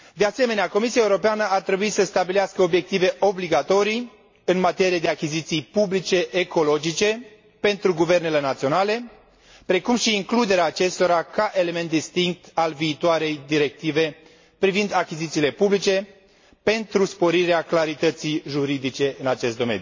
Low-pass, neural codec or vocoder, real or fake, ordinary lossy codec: 7.2 kHz; none; real; MP3, 32 kbps